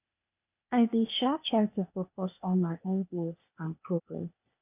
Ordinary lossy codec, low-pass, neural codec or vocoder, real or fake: none; 3.6 kHz; codec, 16 kHz, 0.8 kbps, ZipCodec; fake